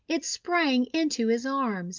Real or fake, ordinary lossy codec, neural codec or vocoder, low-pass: real; Opus, 32 kbps; none; 7.2 kHz